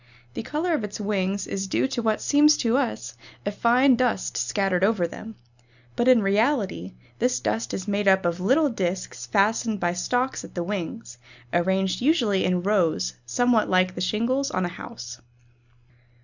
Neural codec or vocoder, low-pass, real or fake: none; 7.2 kHz; real